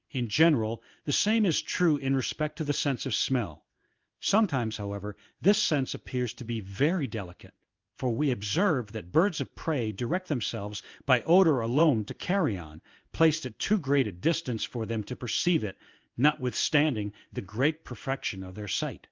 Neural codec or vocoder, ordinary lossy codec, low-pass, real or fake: codec, 16 kHz in and 24 kHz out, 1 kbps, XY-Tokenizer; Opus, 24 kbps; 7.2 kHz; fake